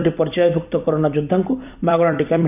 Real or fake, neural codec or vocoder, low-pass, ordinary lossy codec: fake; vocoder, 44.1 kHz, 128 mel bands every 256 samples, BigVGAN v2; 3.6 kHz; none